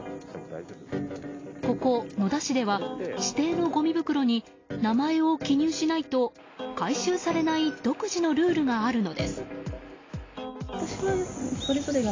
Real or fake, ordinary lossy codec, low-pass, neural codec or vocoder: real; AAC, 32 kbps; 7.2 kHz; none